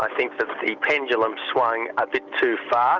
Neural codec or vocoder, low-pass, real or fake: none; 7.2 kHz; real